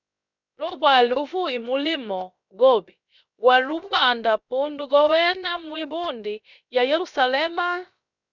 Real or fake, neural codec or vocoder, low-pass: fake; codec, 16 kHz, 0.7 kbps, FocalCodec; 7.2 kHz